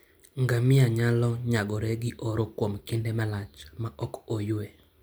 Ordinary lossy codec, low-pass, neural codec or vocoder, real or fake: none; none; none; real